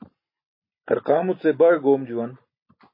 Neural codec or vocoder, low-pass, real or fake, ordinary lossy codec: none; 5.4 kHz; real; MP3, 24 kbps